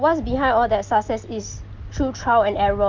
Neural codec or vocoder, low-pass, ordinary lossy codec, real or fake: none; 7.2 kHz; Opus, 32 kbps; real